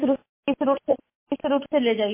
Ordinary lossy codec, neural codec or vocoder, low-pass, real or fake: MP3, 24 kbps; none; 3.6 kHz; real